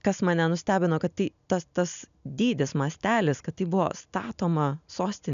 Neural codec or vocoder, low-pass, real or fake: none; 7.2 kHz; real